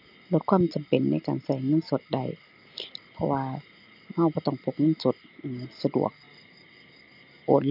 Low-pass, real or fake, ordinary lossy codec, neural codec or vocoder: 5.4 kHz; real; none; none